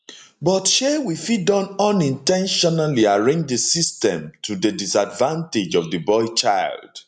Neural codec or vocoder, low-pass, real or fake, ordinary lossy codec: none; 10.8 kHz; real; none